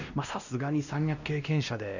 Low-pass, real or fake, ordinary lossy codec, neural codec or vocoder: 7.2 kHz; fake; none; codec, 16 kHz, 1 kbps, X-Codec, WavLM features, trained on Multilingual LibriSpeech